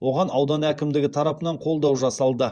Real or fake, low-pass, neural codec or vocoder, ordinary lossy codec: fake; 9.9 kHz; vocoder, 24 kHz, 100 mel bands, Vocos; Opus, 64 kbps